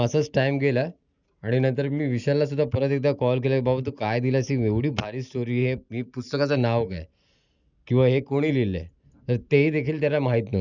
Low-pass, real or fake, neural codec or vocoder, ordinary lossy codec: 7.2 kHz; real; none; none